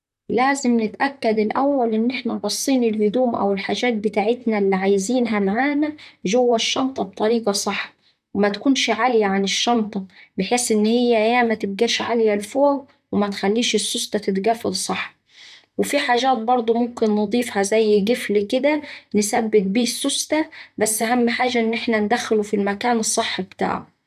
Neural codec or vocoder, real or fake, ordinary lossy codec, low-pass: vocoder, 44.1 kHz, 128 mel bands, Pupu-Vocoder; fake; none; 14.4 kHz